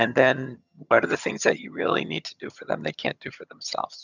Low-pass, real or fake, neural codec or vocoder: 7.2 kHz; fake; vocoder, 22.05 kHz, 80 mel bands, HiFi-GAN